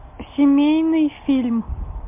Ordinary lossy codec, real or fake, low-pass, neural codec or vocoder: MP3, 32 kbps; real; 3.6 kHz; none